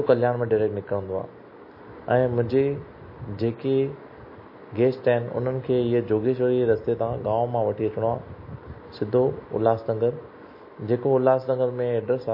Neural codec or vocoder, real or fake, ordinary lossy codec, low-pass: none; real; MP3, 24 kbps; 5.4 kHz